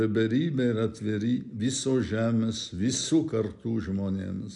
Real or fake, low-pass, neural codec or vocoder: real; 10.8 kHz; none